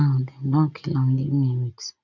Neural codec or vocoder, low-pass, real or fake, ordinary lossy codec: none; 7.2 kHz; real; none